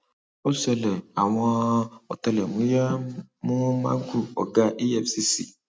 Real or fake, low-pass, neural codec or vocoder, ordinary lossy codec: real; none; none; none